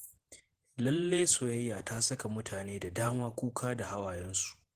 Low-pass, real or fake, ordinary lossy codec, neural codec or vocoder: 14.4 kHz; fake; Opus, 16 kbps; vocoder, 48 kHz, 128 mel bands, Vocos